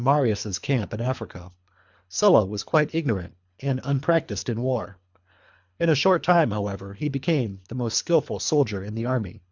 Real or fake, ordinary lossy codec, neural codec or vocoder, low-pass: fake; MP3, 64 kbps; codec, 24 kHz, 3 kbps, HILCodec; 7.2 kHz